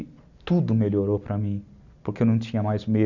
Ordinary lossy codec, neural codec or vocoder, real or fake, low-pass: none; none; real; 7.2 kHz